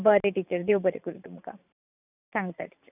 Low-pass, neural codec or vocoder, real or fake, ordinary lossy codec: 3.6 kHz; vocoder, 44.1 kHz, 128 mel bands every 512 samples, BigVGAN v2; fake; none